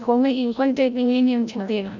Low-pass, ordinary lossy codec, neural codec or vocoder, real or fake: 7.2 kHz; none; codec, 16 kHz, 0.5 kbps, FreqCodec, larger model; fake